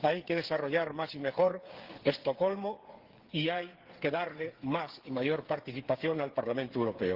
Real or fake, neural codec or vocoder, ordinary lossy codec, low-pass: fake; codec, 16 kHz, 8 kbps, FreqCodec, smaller model; Opus, 16 kbps; 5.4 kHz